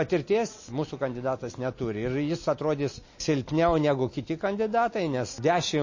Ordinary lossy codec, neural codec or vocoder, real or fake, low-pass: MP3, 32 kbps; none; real; 7.2 kHz